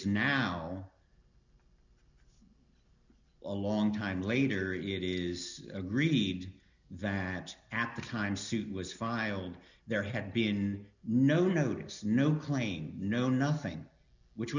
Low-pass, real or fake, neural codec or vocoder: 7.2 kHz; real; none